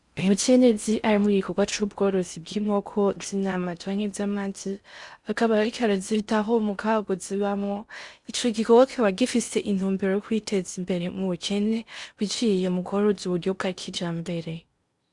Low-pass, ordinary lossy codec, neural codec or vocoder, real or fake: 10.8 kHz; Opus, 64 kbps; codec, 16 kHz in and 24 kHz out, 0.6 kbps, FocalCodec, streaming, 4096 codes; fake